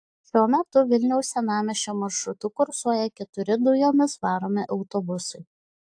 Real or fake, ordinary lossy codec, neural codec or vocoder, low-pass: real; AAC, 64 kbps; none; 9.9 kHz